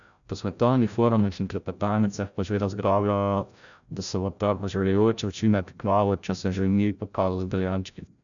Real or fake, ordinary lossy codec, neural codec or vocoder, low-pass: fake; none; codec, 16 kHz, 0.5 kbps, FreqCodec, larger model; 7.2 kHz